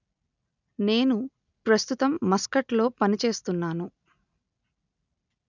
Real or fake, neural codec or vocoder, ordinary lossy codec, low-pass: real; none; none; 7.2 kHz